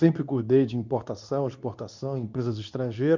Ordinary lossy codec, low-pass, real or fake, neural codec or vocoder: none; 7.2 kHz; fake; codec, 24 kHz, 0.9 kbps, WavTokenizer, medium speech release version 2